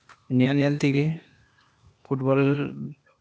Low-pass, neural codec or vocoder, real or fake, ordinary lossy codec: none; codec, 16 kHz, 0.8 kbps, ZipCodec; fake; none